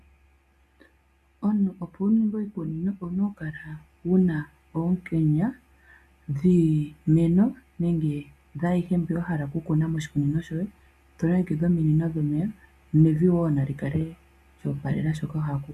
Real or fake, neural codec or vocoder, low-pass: real; none; 14.4 kHz